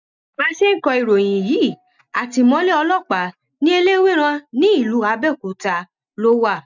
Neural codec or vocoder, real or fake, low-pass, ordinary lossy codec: none; real; 7.2 kHz; none